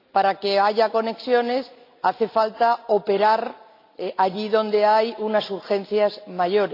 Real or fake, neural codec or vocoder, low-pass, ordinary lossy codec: real; none; 5.4 kHz; AAC, 32 kbps